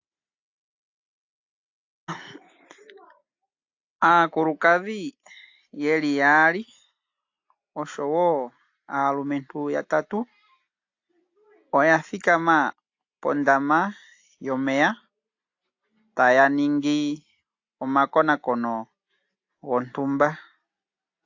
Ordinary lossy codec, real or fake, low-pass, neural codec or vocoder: AAC, 48 kbps; real; 7.2 kHz; none